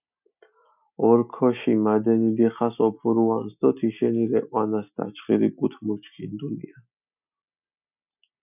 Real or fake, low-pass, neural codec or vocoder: real; 3.6 kHz; none